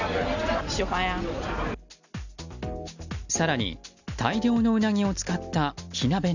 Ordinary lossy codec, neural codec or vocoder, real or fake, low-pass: none; none; real; 7.2 kHz